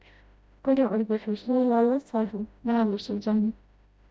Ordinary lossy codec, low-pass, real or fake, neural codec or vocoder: none; none; fake; codec, 16 kHz, 0.5 kbps, FreqCodec, smaller model